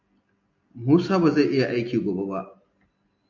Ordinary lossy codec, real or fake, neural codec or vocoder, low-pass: AAC, 48 kbps; real; none; 7.2 kHz